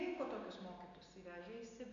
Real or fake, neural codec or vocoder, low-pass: real; none; 7.2 kHz